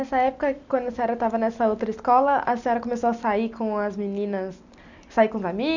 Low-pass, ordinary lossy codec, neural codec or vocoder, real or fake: 7.2 kHz; none; none; real